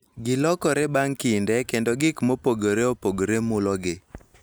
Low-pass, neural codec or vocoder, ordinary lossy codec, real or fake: none; none; none; real